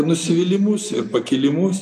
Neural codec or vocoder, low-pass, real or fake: none; 14.4 kHz; real